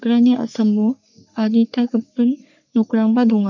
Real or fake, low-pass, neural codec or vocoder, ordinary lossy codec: fake; 7.2 kHz; codec, 44.1 kHz, 3.4 kbps, Pupu-Codec; none